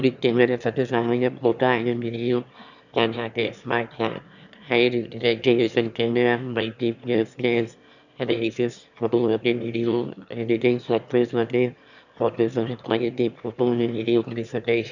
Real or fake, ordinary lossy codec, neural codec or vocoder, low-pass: fake; none; autoencoder, 22.05 kHz, a latent of 192 numbers a frame, VITS, trained on one speaker; 7.2 kHz